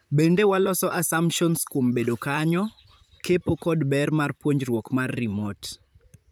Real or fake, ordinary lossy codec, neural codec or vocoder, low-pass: fake; none; vocoder, 44.1 kHz, 128 mel bands, Pupu-Vocoder; none